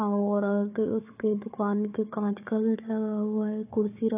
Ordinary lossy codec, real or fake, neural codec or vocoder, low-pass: AAC, 24 kbps; fake; codec, 16 kHz, 4 kbps, FunCodec, trained on Chinese and English, 50 frames a second; 3.6 kHz